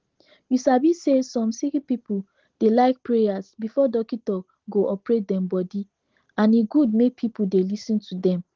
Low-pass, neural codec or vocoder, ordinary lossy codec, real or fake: 7.2 kHz; none; Opus, 16 kbps; real